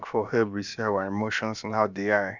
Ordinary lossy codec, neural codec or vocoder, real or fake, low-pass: none; codec, 16 kHz, about 1 kbps, DyCAST, with the encoder's durations; fake; 7.2 kHz